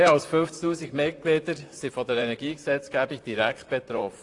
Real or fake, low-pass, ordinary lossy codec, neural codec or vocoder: fake; 10.8 kHz; AAC, 48 kbps; vocoder, 44.1 kHz, 128 mel bands, Pupu-Vocoder